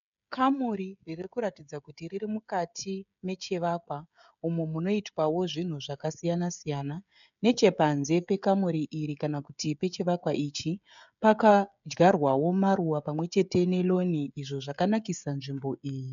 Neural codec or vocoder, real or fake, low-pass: codec, 16 kHz, 16 kbps, FreqCodec, smaller model; fake; 7.2 kHz